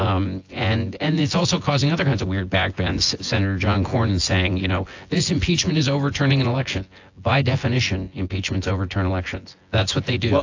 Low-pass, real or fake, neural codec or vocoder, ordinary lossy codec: 7.2 kHz; fake; vocoder, 24 kHz, 100 mel bands, Vocos; AAC, 48 kbps